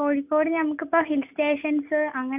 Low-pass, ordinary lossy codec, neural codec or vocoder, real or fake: 3.6 kHz; none; none; real